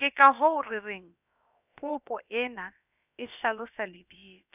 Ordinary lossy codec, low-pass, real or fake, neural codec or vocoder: none; 3.6 kHz; fake; codec, 16 kHz, about 1 kbps, DyCAST, with the encoder's durations